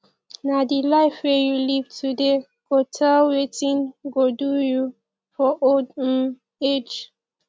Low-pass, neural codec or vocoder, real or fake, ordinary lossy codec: none; none; real; none